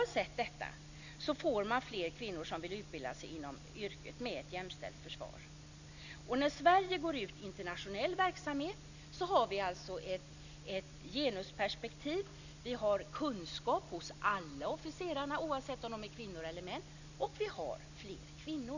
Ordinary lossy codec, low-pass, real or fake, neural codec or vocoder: none; 7.2 kHz; real; none